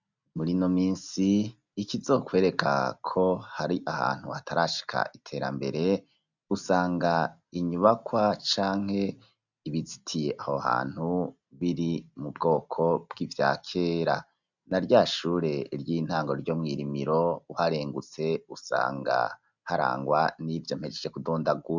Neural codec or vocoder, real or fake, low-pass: none; real; 7.2 kHz